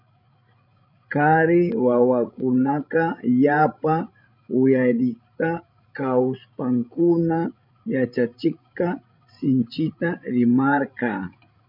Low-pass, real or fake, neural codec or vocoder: 5.4 kHz; fake; codec, 16 kHz, 16 kbps, FreqCodec, larger model